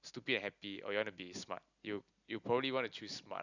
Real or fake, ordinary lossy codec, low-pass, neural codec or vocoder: real; none; 7.2 kHz; none